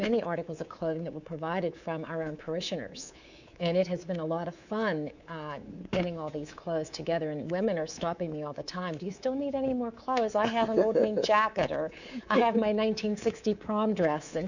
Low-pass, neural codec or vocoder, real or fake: 7.2 kHz; codec, 24 kHz, 3.1 kbps, DualCodec; fake